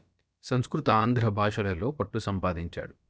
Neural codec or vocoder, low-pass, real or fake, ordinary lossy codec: codec, 16 kHz, about 1 kbps, DyCAST, with the encoder's durations; none; fake; none